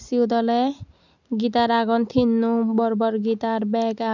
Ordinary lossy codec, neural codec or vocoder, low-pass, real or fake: none; none; 7.2 kHz; real